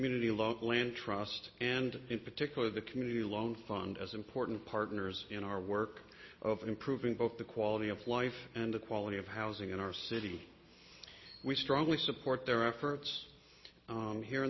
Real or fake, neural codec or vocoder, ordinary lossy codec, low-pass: real; none; MP3, 24 kbps; 7.2 kHz